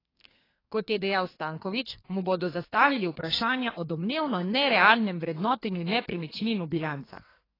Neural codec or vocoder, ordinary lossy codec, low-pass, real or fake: codec, 32 kHz, 1.9 kbps, SNAC; AAC, 24 kbps; 5.4 kHz; fake